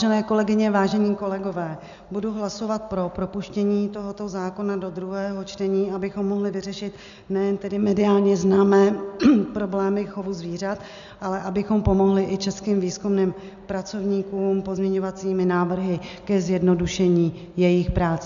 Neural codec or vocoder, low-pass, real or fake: none; 7.2 kHz; real